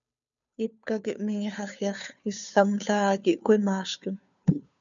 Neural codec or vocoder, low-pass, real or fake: codec, 16 kHz, 2 kbps, FunCodec, trained on Chinese and English, 25 frames a second; 7.2 kHz; fake